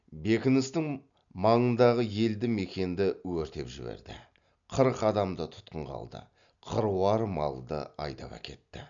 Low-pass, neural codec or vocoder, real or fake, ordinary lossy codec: 7.2 kHz; none; real; none